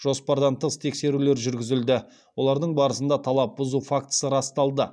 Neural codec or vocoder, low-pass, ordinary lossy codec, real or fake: none; none; none; real